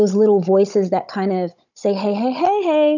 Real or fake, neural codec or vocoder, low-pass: fake; codec, 16 kHz, 16 kbps, FunCodec, trained on Chinese and English, 50 frames a second; 7.2 kHz